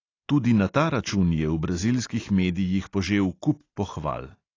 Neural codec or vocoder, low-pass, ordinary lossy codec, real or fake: none; 7.2 kHz; AAC, 32 kbps; real